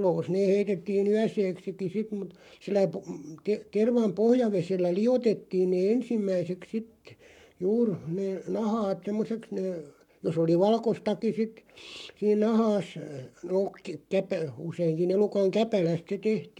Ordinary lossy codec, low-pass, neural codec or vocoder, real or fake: none; 19.8 kHz; codec, 44.1 kHz, 7.8 kbps, Pupu-Codec; fake